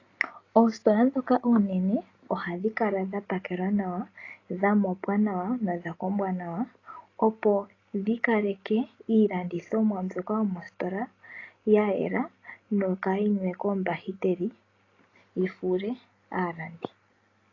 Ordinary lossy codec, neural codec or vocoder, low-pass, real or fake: AAC, 32 kbps; none; 7.2 kHz; real